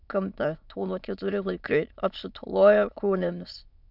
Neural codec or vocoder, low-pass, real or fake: autoencoder, 22.05 kHz, a latent of 192 numbers a frame, VITS, trained on many speakers; 5.4 kHz; fake